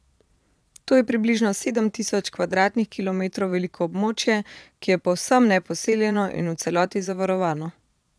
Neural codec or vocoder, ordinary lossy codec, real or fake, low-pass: vocoder, 22.05 kHz, 80 mel bands, Vocos; none; fake; none